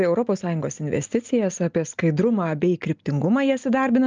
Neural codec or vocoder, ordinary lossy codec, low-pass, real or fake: none; Opus, 24 kbps; 7.2 kHz; real